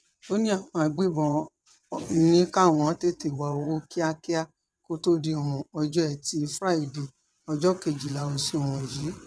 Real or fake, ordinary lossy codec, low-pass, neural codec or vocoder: fake; none; none; vocoder, 22.05 kHz, 80 mel bands, WaveNeXt